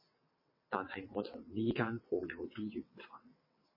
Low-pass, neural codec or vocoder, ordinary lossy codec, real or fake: 5.4 kHz; vocoder, 22.05 kHz, 80 mel bands, WaveNeXt; MP3, 24 kbps; fake